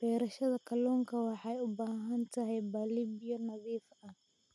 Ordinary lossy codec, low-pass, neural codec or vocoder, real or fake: none; none; none; real